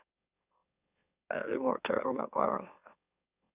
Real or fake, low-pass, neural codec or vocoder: fake; 3.6 kHz; autoencoder, 44.1 kHz, a latent of 192 numbers a frame, MeloTTS